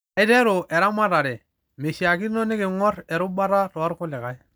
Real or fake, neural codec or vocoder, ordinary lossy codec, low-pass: real; none; none; none